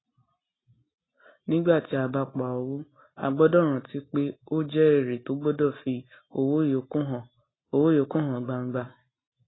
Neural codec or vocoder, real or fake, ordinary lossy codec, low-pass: none; real; AAC, 16 kbps; 7.2 kHz